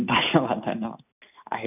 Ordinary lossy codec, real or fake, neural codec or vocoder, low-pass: none; real; none; 3.6 kHz